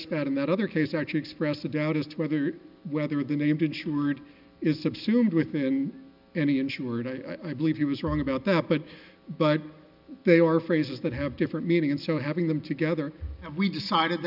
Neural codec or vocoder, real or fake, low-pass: none; real; 5.4 kHz